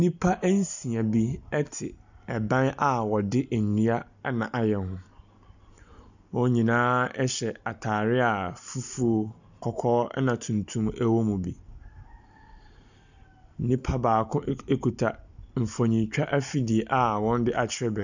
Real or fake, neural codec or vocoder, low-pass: real; none; 7.2 kHz